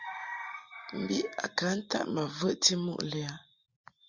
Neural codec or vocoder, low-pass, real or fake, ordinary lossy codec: none; 7.2 kHz; real; Opus, 64 kbps